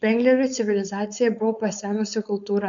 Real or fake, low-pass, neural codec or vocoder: fake; 7.2 kHz; codec, 16 kHz, 4.8 kbps, FACodec